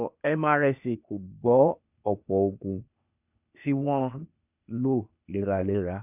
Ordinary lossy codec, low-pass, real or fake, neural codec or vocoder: none; 3.6 kHz; fake; codec, 16 kHz, 0.8 kbps, ZipCodec